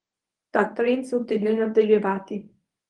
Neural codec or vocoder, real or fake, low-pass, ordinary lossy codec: codec, 24 kHz, 0.9 kbps, WavTokenizer, medium speech release version 1; fake; 10.8 kHz; Opus, 24 kbps